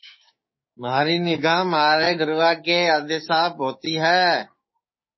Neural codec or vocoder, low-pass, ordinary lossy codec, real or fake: codec, 16 kHz, 6 kbps, DAC; 7.2 kHz; MP3, 24 kbps; fake